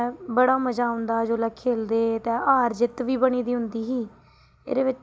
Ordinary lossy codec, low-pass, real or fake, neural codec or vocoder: none; none; real; none